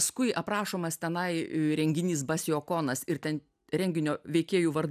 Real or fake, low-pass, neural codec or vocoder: real; 14.4 kHz; none